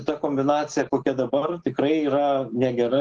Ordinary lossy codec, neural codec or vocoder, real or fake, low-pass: Opus, 16 kbps; none; real; 9.9 kHz